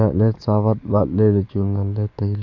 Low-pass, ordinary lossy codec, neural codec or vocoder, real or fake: 7.2 kHz; none; codec, 44.1 kHz, 7.8 kbps, DAC; fake